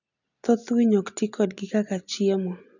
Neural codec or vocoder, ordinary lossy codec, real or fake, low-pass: none; none; real; 7.2 kHz